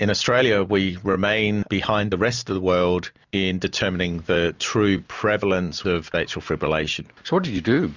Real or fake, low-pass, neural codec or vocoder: real; 7.2 kHz; none